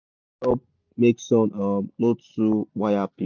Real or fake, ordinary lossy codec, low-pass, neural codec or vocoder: real; none; 7.2 kHz; none